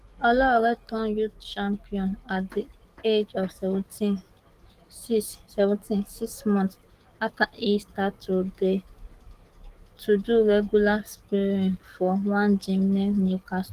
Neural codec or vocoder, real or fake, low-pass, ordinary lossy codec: codec, 44.1 kHz, 7.8 kbps, DAC; fake; 14.4 kHz; Opus, 24 kbps